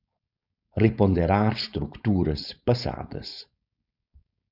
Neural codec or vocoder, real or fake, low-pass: codec, 16 kHz, 4.8 kbps, FACodec; fake; 5.4 kHz